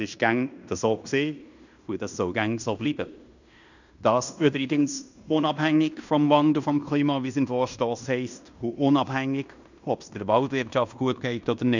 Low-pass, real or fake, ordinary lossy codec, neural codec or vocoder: 7.2 kHz; fake; none; codec, 16 kHz in and 24 kHz out, 0.9 kbps, LongCat-Audio-Codec, fine tuned four codebook decoder